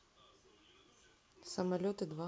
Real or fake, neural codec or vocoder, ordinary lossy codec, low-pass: real; none; none; none